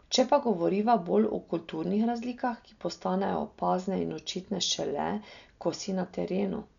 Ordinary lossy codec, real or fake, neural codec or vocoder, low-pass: none; real; none; 7.2 kHz